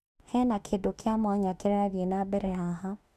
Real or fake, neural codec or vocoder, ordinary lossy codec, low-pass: fake; autoencoder, 48 kHz, 32 numbers a frame, DAC-VAE, trained on Japanese speech; none; 14.4 kHz